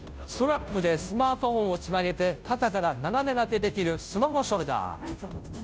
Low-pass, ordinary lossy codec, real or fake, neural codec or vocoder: none; none; fake; codec, 16 kHz, 0.5 kbps, FunCodec, trained on Chinese and English, 25 frames a second